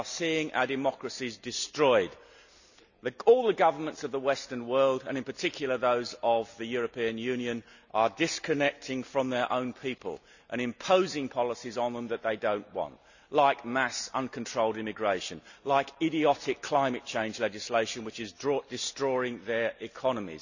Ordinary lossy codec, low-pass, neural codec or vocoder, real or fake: none; 7.2 kHz; none; real